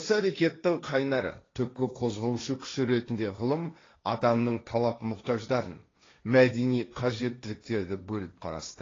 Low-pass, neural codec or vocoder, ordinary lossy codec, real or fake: 7.2 kHz; codec, 16 kHz, 1.1 kbps, Voila-Tokenizer; AAC, 32 kbps; fake